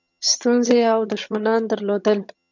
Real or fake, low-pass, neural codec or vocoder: fake; 7.2 kHz; vocoder, 22.05 kHz, 80 mel bands, HiFi-GAN